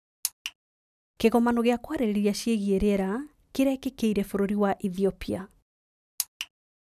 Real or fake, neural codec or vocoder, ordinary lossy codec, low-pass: real; none; none; 14.4 kHz